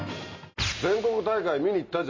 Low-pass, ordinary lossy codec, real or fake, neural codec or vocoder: 7.2 kHz; MP3, 32 kbps; real; none